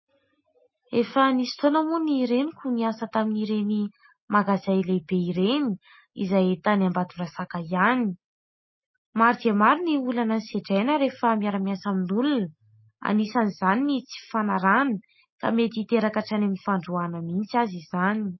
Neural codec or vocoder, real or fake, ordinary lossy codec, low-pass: none; real; MP3, 24 kbps; 7.2 kHz